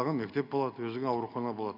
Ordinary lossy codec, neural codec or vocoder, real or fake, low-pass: none; none; real; 5.4 kHz